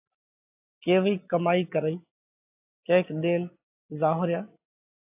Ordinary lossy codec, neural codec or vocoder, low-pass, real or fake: AAC, 32 kbps; none; 3.6 kHz; real